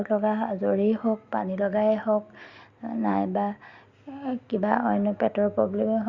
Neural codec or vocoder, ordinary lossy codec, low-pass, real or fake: none; Opus, 64 kbps; 7.2 kHz; real